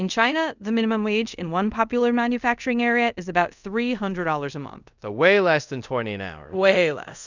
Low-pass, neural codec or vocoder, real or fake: 7.2 kHz; codec, 24 kHz, 0.5 kbps, DualCodec; fake